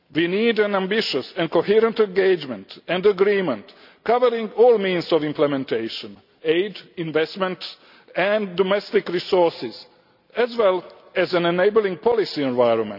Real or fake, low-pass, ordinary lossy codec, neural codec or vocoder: real; 5.4 kHz; none; none